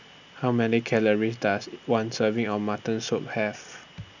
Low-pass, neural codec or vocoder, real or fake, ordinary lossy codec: 7.2 kHz; none; real; none